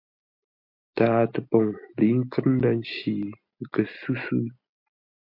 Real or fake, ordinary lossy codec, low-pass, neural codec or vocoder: real; MP3, 48 kbps; 5.4 kHz; none